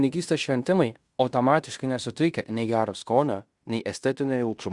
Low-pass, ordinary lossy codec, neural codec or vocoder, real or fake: 10.8 kHz; Opus, 64 kbps; codec, 16 kHz in and 24 kHz out, 0.9 kbps, LongCat-Audio-Codec, fine tuned four codebook decoder; fake